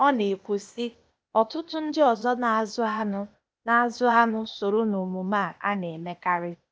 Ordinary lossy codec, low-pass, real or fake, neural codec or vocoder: none; none; fake; codec, 16 kHz, 0.8 kbps, ZipCodec